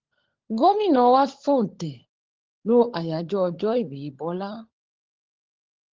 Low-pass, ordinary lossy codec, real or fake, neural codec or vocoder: 7.2 kHz; Opus, 16 kbps; fake; codec, 16 kHz, 16 kbps, FunCodec, trained on LibriTTS, 50 frames a second